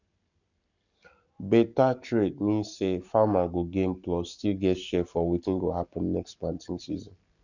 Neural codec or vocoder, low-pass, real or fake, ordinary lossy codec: codec, 44.1 kHz, 7.8 kbps, Pupu-Codec; 7.2 kHz; fake; none